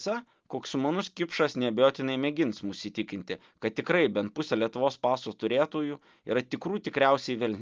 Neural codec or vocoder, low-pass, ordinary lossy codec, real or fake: none; 7.2 kHz; Opus, 24 kbps; real